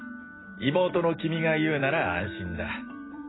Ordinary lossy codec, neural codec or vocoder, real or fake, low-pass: AAC, 16 kbps; vocoder, 44.1 kHz, 128 mel bands every 256 samples, BigVGAN v2; fake; 7.2 kHz